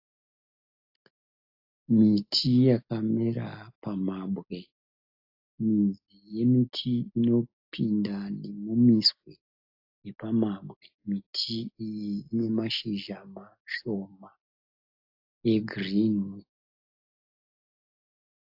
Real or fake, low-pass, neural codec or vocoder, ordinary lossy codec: real; 5.4 kHz; none; AAC, 48 kbps